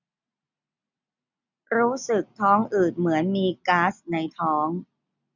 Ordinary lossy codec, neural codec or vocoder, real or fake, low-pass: none; none; real; 7.2 kHz